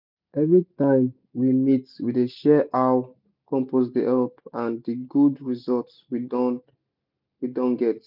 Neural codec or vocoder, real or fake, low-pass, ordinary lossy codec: none; real; 5.4 kHz; none